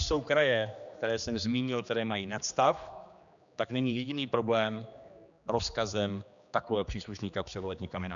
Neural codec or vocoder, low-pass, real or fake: codec, 16 kHz, 2 kbps, X-Codec, HuBERT features, trained on general audio; 7.2 kHz; fake